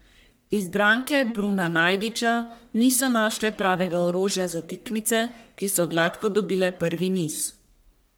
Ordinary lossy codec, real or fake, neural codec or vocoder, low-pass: none; fake; codec, 44.1 kHz, 1.7 kbps, Pupu-Codec; none